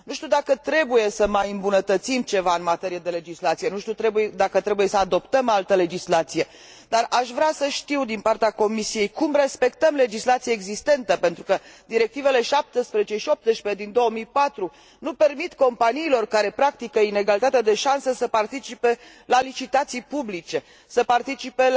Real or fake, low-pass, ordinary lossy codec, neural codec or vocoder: real; none; none; none